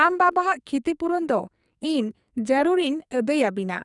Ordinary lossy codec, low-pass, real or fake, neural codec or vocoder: none; 10.8 kHz; fake; codec, 44.1 kHz, 2.6 kbps, SNAC